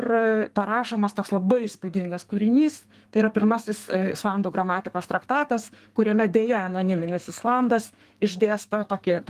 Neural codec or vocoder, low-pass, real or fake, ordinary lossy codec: codec, 32 kHz, 1.9 kbps, SNAC; 14.4 kHz; fake; Opus, 24 kbps